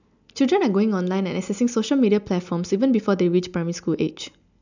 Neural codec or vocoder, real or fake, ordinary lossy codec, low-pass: none; real; none; 7.2 kHz